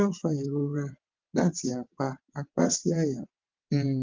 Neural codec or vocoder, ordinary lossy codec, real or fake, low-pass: vocoder, 22.05 kHz, 80 mel bands, WaveNeXt; Opus, 24 kbps; fake; 7.2 kHz